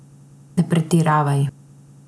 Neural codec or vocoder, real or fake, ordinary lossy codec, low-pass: none; real; none; none